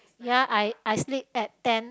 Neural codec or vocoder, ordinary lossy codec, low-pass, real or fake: none; none; none; real